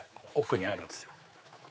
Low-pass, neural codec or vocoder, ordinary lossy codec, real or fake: none; codec, 16 kHz, 4 kbps, X-Codec, HuBERT features, trained on general audio; none; fake